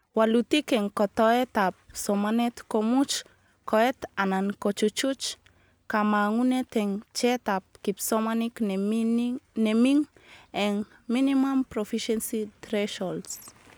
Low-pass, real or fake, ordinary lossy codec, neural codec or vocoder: none; real; none; none